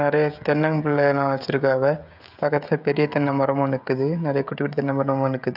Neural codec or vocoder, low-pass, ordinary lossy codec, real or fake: codec, 16 kHz, 16 kbps, FreqCodec, smaller model; 5.4 kHz; none; fake